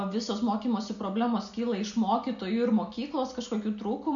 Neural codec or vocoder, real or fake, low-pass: none; real; 7.2 kHz